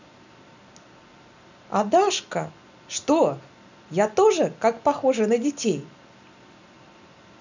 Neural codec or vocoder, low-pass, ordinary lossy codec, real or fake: none; 7.2 kHz; none; real